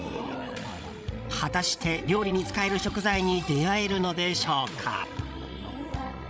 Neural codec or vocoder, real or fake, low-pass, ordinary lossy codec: codec, 16 kHz, 16 kbps, FreqCodec, larger model; fake; none; none